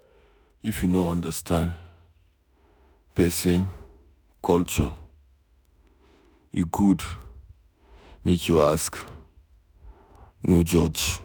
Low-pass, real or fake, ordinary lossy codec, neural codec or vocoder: none; fake; none; autoencoder, 48 kHz, 32 numbers a frame, DAC-VAE, trained on Japanese speech